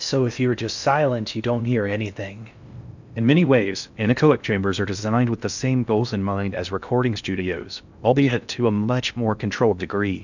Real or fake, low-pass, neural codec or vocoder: fake; 7.2 kHz; codec, 16 kHz in and 24 kHz out, 0.6 kbps, FocalCodec, streaming, 2048 codes